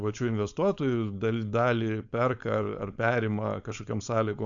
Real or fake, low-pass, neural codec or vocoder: fake; 7.2 kHz; codec, 16 kHz, 4.8 kbps, FACodec